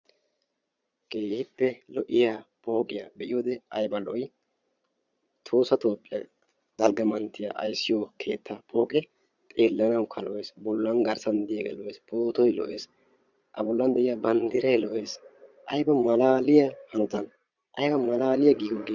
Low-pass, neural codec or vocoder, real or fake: 7.2 kHz; vocoder, 22.05 kHz, 80 mel bands, Vocos; fake